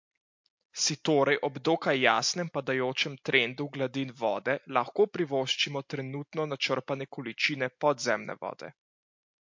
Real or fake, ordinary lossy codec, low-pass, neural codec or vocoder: real; MP3, 48 kbps; 7.2 kHz; none